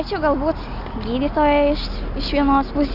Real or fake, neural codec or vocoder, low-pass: real; none; 5.4 kHz